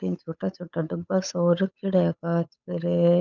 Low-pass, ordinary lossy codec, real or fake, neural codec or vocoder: 7.2 kHz; none; fake; codec, 16 kHz, 8 kbps, FunCodec, trained on Chinese and English, 25 frames a second